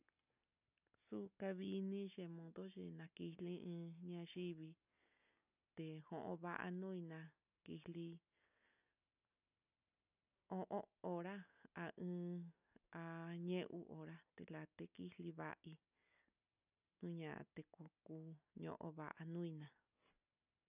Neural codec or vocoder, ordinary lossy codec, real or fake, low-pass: none; none; real; 3.6 kHz